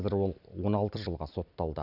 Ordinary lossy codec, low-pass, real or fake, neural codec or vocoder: none; 5.4 kHz; fake; vocoder, 44.1 kHz, 128 mel bands every 256 samples, BigVGAN v2